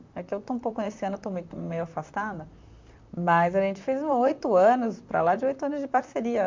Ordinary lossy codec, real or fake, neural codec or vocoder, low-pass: MP3, 64 kbps; fake; vocoder, 44.1 kHz, 128 mel bands, Pupu-Vocoder; 7.2 kHz